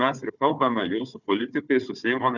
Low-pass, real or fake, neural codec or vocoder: 7.2 kHz; fake; codec, 16 kHz, 4 kbps, FunCodec, trained on Chinese and English, 50 frames a second